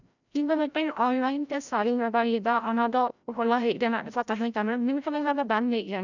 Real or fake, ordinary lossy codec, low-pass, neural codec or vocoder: fake; none; 7.2 kHz; codec, 16 kHz, 0.5 kbps, FreqCodec, larger model